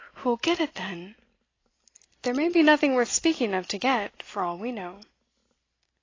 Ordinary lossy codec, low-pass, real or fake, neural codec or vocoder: AAC, 32 kbps; 7.2 kHz; real; none